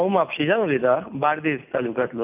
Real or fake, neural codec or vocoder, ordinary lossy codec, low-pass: real; none; none; 3.6 kHz